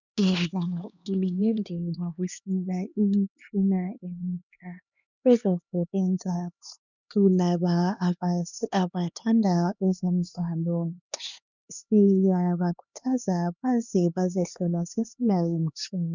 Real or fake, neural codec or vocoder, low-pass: fake; codec, 16 kHz, 2 kbps, X-Codec, HuBERT features, trained on LibriSpeech; 7.2 kHz